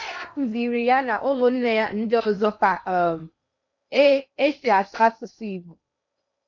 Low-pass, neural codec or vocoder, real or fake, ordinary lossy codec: 7.2 kHz; codec, 16 kHz in and 24 kHz out, 0.8 kbps, FocalCodec, streaming, 65536 codes; fake; none